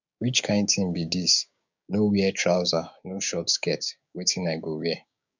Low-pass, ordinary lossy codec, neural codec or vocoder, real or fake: 7.2 kHz; none; codec, 16 kHz, 6 kbps, DAC; fake